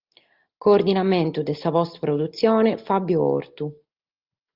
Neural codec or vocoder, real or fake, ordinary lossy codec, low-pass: none; real; Opus, 32 kbps; 5.4 kHz